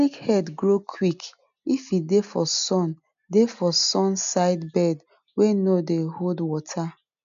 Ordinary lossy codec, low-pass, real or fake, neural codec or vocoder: MP3, 64 kbps; 7.2 kHz; real; none